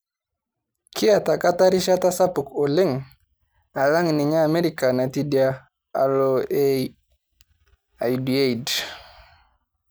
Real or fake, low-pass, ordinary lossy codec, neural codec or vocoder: real; none; none; none